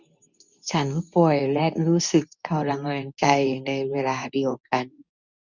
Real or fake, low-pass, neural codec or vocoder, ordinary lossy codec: fake; 7.2 kHz; codec, 24 kHz, 0.9 kbps, WavTokenizer, medium speech release version 2; none